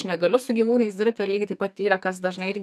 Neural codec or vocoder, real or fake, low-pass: codec, 44.1 kHz, 2.6 kbps, SNAC; fake; 14.4 kHz